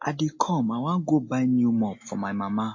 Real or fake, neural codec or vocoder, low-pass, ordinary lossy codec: real; none; 7.2 kHz; MP3, 32 kbps